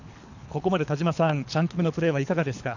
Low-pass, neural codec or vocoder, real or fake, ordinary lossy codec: 7.2 kHz; codec, 24 kHz, 3 kbps, HILCodec; fake; none